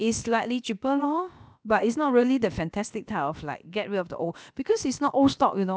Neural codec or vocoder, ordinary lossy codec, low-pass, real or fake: codec, 16 kHz, about 1 kbps, DyCAST, with the encoder's durations; none; none; fake